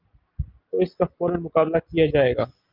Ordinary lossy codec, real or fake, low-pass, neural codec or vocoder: Opus, 24 kbps; real; 5.4 kHz; none